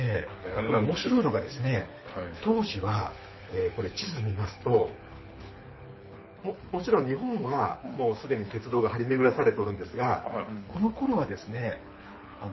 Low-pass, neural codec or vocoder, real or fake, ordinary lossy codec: 7.2 kHz; codec, 24 kHz, 6 kbps, HILCodec; fake; MP3, 24 kbps